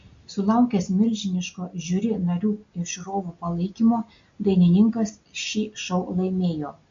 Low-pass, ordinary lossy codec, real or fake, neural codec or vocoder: 7.2 kHz; MP3, 48 kbps; real; none